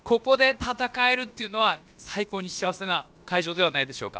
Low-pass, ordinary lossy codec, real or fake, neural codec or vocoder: none; none; fake; codec, 16 kHz, about 1 kbps, DyCAST, with the encoder's durations